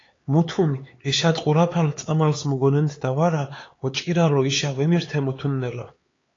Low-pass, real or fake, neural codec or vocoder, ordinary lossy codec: 7.2 kHz; fake; codec, 16 kHz, 4 kbps, X-Codec, HuBERT features, trained on LibriSpeech; AAC, 32 kbps